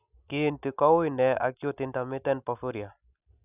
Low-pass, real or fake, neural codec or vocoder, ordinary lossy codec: 3.6 kHz; real; none; none